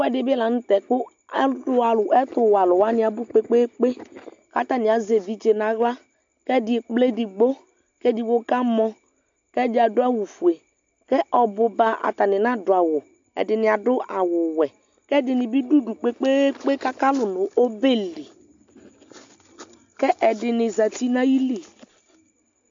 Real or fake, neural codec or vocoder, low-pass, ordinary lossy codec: real; none; 7.2 kHz; AAC, 64 kbps